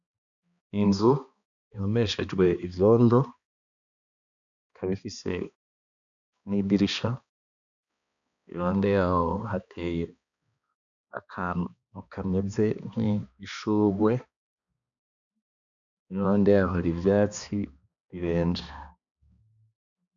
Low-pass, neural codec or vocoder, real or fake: 7.2 kHz; codec, 16 kHz, 2 kbps, X-Codec, HuBERT features, trained on balanced general audio; fake